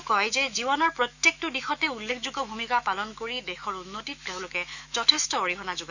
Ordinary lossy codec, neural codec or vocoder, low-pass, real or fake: none; autoencoder, 48 kHz, 128 numbers a frame, DAC-VAE, trained on Japanese speech; 7.2 kHz; fake